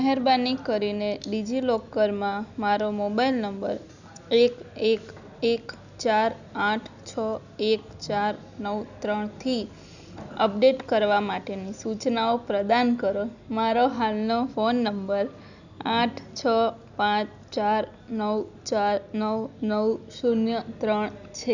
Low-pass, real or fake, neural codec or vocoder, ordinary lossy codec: 7.2 kHz; real; none; none